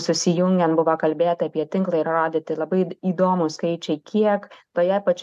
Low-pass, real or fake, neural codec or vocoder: 14.4 kHz; real; none